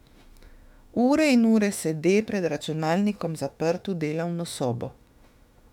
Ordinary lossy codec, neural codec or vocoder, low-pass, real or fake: none; autoencoder, 48 kHz, 32 numbers a frame, DAC-VAE, trained on Japanese speech; 19.8 kHz; fake